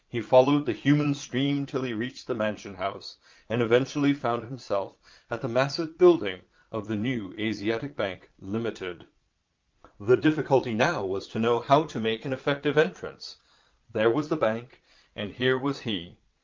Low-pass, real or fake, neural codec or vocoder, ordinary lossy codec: 7.2 kHz; fake; vocoder, 22.05 kHz, 80 mel bands, Vocos; Opus, 24 kbps